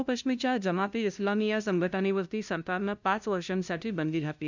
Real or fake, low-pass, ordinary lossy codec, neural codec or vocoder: fake; 7.2 kHz; none; codec, 16 kHz, 0.5 kbps, FunCodec, trained on LibriTTS, 25 frames a second